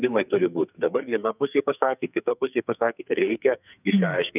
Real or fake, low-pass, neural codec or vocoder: fake; 3.6 kHz; codec, 44.1 kHz, 2.6 kbps, SNAC